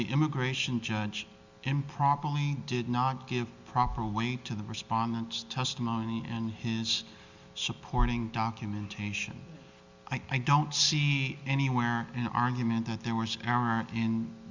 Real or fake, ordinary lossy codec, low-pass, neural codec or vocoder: real; Opus, 64 kbps; 7.2 kHz; none